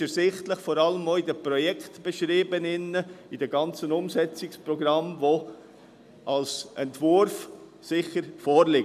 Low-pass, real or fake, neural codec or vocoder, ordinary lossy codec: 14.4 kHz; real; none; none